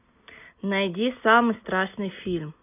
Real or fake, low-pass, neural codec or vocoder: real; 3.6 kHz; none